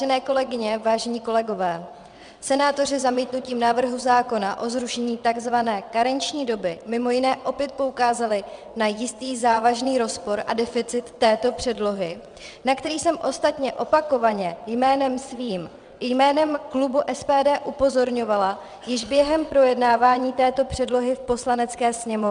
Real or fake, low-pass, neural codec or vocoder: fake; 9.9 kHz; vocoder, 22.05 kHz, 80 mel bands, WaveNeXt